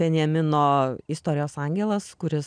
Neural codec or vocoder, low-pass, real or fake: none; 9.9 kHz; real